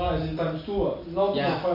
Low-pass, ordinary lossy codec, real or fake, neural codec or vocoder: 5.4 kHz; MP3, 32 kbps; real; none